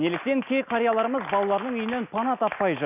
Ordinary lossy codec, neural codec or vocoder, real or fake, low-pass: none; none; real; 3.6 kHz